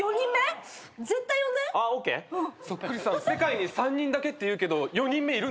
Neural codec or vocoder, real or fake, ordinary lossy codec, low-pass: none; real; none; none